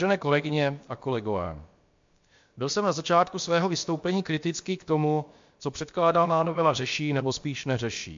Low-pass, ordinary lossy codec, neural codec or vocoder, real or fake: 7.2 kHz; MP3, 48 kbps; codec, 16 kHz, about 1 kbps, DyCAST, with the encoder's durations; fake